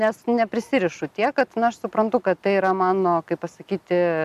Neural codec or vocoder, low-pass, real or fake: none; 14.4 kHz; real